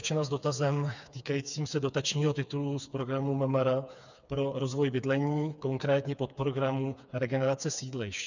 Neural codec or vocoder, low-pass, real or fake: codec, 16 kHz, 4 kbps, FreqCodec, smaller model; 7.2 kHz; fake